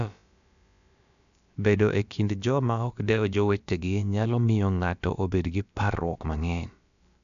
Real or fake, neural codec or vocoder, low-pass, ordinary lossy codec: fake; codec, 16 kHz, about 1 kbps, DyCAST, with the encoder's durations; 7.2 kHz; none